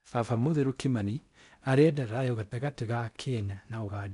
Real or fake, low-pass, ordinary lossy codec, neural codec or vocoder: fake; 10.8 kHz; none; codec, 16 kHz in and 24 kHz out, 0.6 kbps, FocalCodec, streaming, 4096 codes